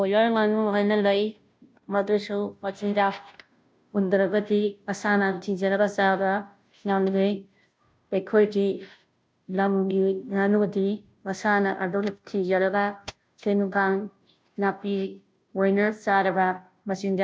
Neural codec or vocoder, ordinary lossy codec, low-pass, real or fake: codec, 16 kHz, 0.5 kbps, FunCodec, trained on Chinese and English, 25 frames a second; none; none; fake